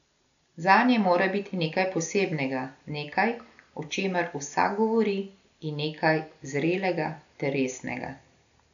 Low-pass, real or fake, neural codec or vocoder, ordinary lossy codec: 7.2 kHz; real; none; none